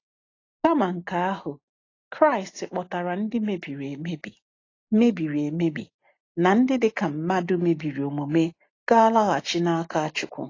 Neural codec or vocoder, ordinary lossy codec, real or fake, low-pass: none; AAC, 32 kbps; real; 7.2 kHz